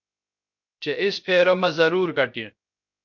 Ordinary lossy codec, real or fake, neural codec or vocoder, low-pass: MP3, 48 kbps; fake; codec, 16 kHz, 0.3 kbps, FocalCodec; 7.2 kHz